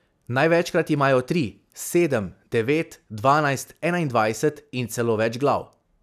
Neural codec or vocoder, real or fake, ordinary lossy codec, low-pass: none; real; none; 14.4 kHz